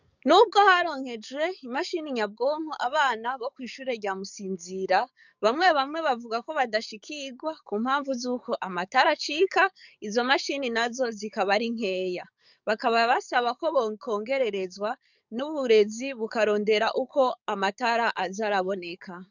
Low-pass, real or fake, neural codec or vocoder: 7.2 kHz; fake; codec, 44.1 kHz, 7.8 kbps, DAC